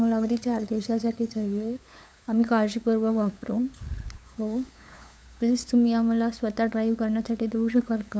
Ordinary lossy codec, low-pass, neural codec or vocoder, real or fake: none; none; codec, 16 kHz, 8 kbps, FunCodec, trained on LibriTTS, 25 frames a second; fake